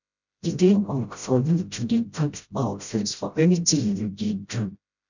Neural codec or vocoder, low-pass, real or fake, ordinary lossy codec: codec, 16 kHz, 0.5 kbps, FreqCodec, smaller model; 7.2 kHz; fake; none